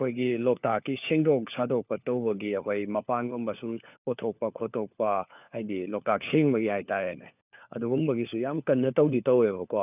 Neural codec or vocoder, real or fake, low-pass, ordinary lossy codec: codec, 16 kHz, 4 kbps, FunCodec, trained on LibriTTS, 50 frames a second; fake; 3.6 kHz; none